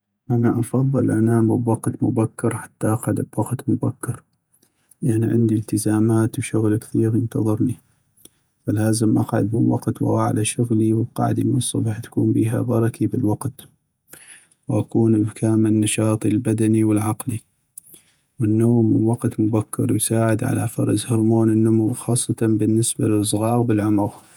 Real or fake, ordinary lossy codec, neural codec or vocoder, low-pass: fake; none; vocoder, 48 kHz, 128 mel bands, Vocos; none